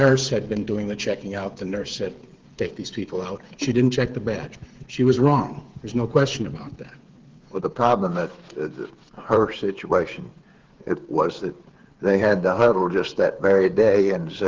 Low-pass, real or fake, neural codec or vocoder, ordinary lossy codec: 7.2 kHz; fake; codec, 16 kHz, 8 kbps, FreqCodec, smaller model; Opus, 16 kbps